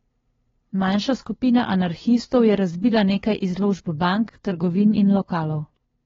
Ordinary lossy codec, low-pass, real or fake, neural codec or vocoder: AAC, 24 kbps; 7.2 kHz; fake; codec, 16 kHz, 2 kbps, FunCodec, trained on LibriTTS, 25 frames a second